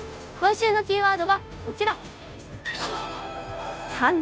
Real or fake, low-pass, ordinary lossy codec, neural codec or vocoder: fake; none; none; codec, 16 kHz, 0.5 kbps, FunCodec, trained on Chinese and English, 25 frames a second